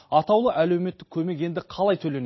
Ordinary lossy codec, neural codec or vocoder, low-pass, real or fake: MP3, 24 kbps; none; 7.2 kHz; real